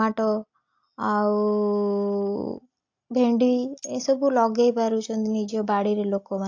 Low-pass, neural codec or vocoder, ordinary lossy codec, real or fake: 7.2 kHz; none; AAC, 48 kbps; real